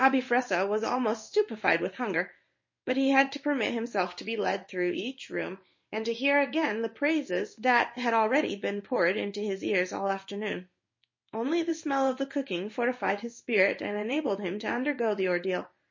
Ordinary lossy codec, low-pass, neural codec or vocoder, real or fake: MP3, 32 kbps; 7.2 kHz; codec, 16 kHz in and 24 kHz out, 1 kbps, XY-Tokenizer; fake